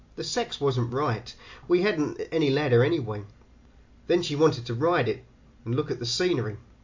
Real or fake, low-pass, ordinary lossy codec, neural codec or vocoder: real; 7.2 kHz; MP3, 48 kbps; none